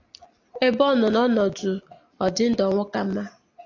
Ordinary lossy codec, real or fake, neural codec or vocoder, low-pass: AAC, 48 kbps; real; none; 7.2 kHz